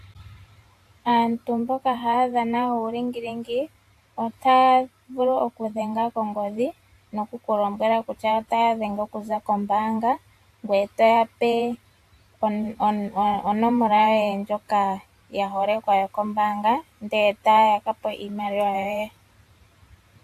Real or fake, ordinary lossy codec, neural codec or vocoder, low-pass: fake; AAC, 64 kbps; vocoder, 44.1 kHz, 128 mel bands every 512 samples, BigVGAN v2; 14.4 kHz